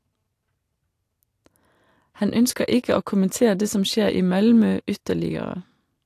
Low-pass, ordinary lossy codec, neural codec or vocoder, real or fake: 14.4 kHz; AAC, 64 kbps; none; real